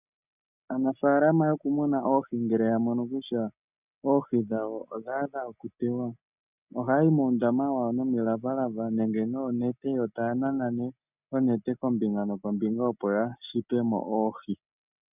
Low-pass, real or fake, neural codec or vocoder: 3.6 kHz; real; none